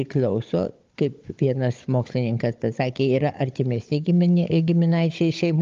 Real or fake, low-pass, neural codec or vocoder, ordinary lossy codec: fake; 7.2 kHz; codec, 16 kHz, 16 kbps, FunCodec, trained on Chinese and English, 50 frames a second; Opus, 32 kbps